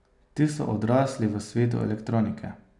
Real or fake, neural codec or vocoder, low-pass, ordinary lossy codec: real; none; 10.8 kHz; none